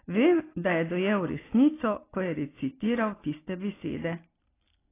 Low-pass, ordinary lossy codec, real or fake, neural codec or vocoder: 3.6 kHz; AAC, 16 kbps; real; none